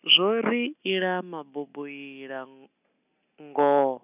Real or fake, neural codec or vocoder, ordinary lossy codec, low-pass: real; none; none; 3.6 kHz